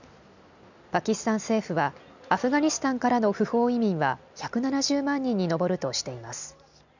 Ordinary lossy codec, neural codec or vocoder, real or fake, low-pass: none; none; real; 7.2 kHz